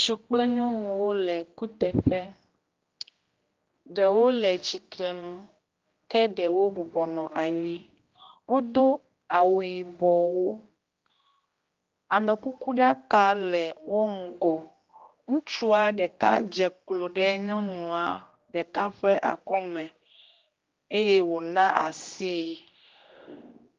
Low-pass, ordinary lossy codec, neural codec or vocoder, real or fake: 7.2 kHz; Opus, 32 kbps; codec, 16 kHz, 1 kbps, X-Codec, HuBERT features, trained on general audio; fake